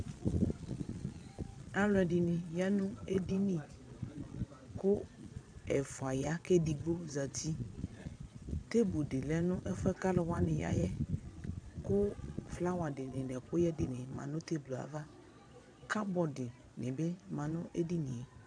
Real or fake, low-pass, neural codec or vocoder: fake; 9.9 kHz; vocoder, 22.05 kHz, 80 mel bands, Vocos